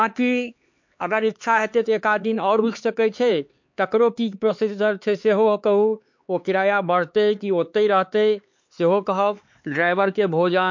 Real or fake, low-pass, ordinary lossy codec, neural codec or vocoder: fake; 7.2 kHz; MP3, 48 kbps; codec, 16 kHz, 4 kbps, X-Codec, HuBERT features, trained on LibriSpeech